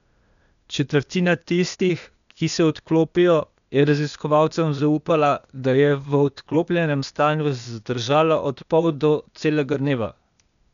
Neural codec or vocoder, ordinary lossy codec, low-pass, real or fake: codec, 16 kHz, 0.8 kbps, ZipCodec; none; 7.2 kHz; fake